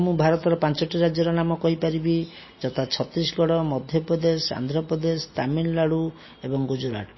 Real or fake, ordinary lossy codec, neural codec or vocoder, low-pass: real; MP3, 24 kbps; none; 7.2 kHz